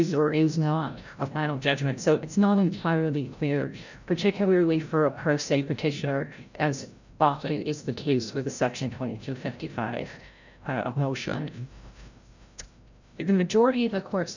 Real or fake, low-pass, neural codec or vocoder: fake; 7.2 kHz; codec, 16 kHz, 0.5 kbps, FreqCodec, larger model